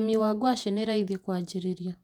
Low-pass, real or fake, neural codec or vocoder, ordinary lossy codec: 19.8 kHz; fake; vocoder, 48 kHz, 128 mel bands, Vocos; none